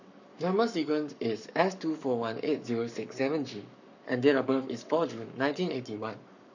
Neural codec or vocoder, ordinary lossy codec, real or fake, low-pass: codec, 44.1 kHz, 7.8 kbps, Pupu-Codec; none; fake; 7.2 kHz